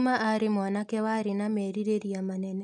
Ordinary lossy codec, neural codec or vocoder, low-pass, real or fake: none; none; 10.8 kHz; real